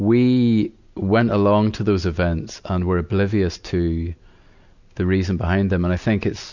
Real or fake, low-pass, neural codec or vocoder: real; 7.2 kHz; none